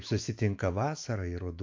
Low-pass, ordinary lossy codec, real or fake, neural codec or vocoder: 7.2 kHz; MP3, 48 kbps; fake; autoencoder, 48 kHz, 128 numbers a frame, DAC-VAE, trained on Japanese speech